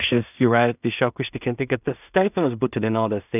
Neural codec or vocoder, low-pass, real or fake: codec, 16 kHz in and 24 kHz out, 0.4 kbps, LongCat-Audio-Codec, two codebook decoder; 3.6 kHz; fake